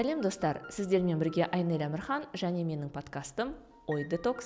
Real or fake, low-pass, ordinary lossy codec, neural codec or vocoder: real; none; none; none